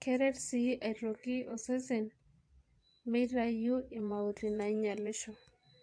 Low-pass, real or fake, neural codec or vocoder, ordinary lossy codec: 9.9 kHz; fake; vocoder, 22.05 kHz, 80 mel bands, Vocos; AAC, 64 kbps